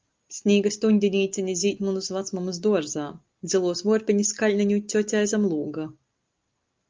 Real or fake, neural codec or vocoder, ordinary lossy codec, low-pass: real; none; Opus, 24 kbps; 7.2 kHz